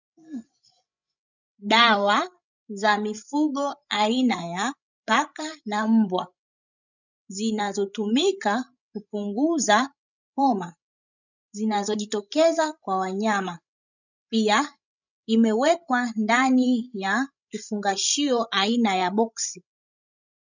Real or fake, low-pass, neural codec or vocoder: fake; 7.2 kHz; codec, 16 kHz, 16 kbps, FreqCodec, larger model